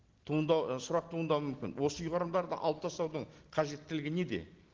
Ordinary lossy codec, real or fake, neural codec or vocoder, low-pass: Opus, 16 kbps; real; none; 7.2 kHz